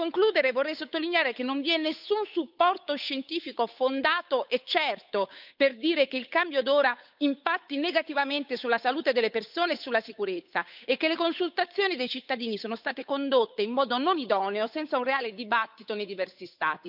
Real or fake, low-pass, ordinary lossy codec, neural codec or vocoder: fake; 5.4 kHz; none; codec, 16 kHz, 8 kbps, FunCodec, trained on Chinese and English, 25 frames a second